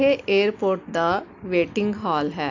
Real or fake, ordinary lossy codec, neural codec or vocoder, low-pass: real; AAC, 48 kbps; none; 7.2 kHz